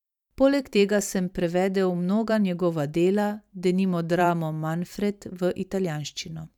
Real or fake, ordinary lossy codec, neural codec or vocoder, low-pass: fake; none; vocoder, 44.1 kHz, 128 mel bands every 512 samples, BigVGAN v2; 19.8 kHz